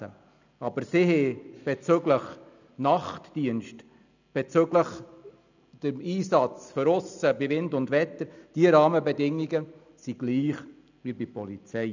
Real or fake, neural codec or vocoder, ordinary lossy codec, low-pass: real; none; none; 7.2 kHz